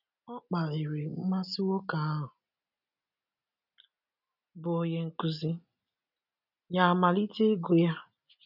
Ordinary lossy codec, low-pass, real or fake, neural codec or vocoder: none; 5.4 kHz; real; none